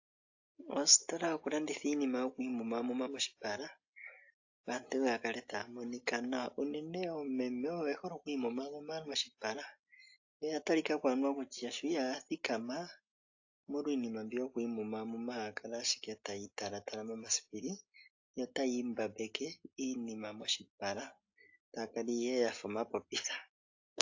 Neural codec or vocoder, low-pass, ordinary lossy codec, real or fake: none; 7.2 kHz; AAC, 48 kbps; real